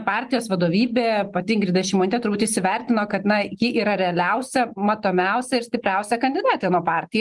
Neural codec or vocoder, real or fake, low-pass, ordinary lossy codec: none; real; 10.8 kHz; Opus, 24 kbps